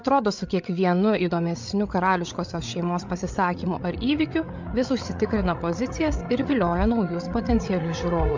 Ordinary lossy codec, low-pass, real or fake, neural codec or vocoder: MP3, 64 kbps; 7.2 kHz; fake; codec, 16 kHz, 8 kbps, FreqCodec, larger model